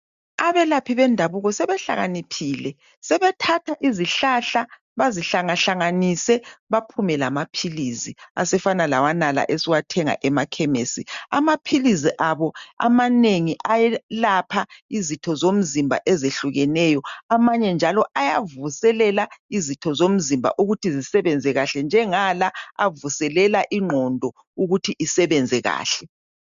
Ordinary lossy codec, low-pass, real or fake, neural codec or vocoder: MP3, 64 kbps; 7.2 kHz; real; none